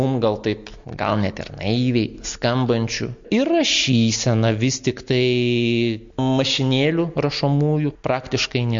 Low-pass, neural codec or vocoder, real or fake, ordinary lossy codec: 7.2 kHz; none; real; MP3, 48 kbps